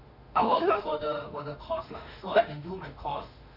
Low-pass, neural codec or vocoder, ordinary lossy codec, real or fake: 5.4 kHz; autoencoder, 48 kHz, 32 numbers a frame, DAC-VAE, trained on Japanese speech; none; fake